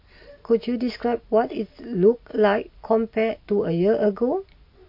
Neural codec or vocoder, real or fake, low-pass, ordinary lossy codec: none; real; 5.4 kHz; MP3, 32 kbps